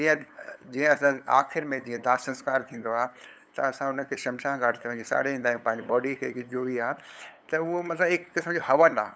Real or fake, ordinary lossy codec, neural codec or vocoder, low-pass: fake; none; codec, 16 kHz, 8 kbps, FunCodec, trained on LibriTTS, 25 frames a second; none